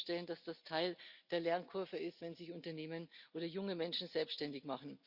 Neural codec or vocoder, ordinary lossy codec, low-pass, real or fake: none; Opus, 64 kbps; 5.4 kHz; real